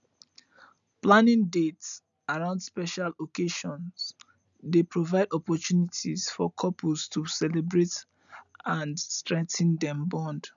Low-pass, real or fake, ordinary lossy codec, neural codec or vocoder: 7.2 kHz; real; none; none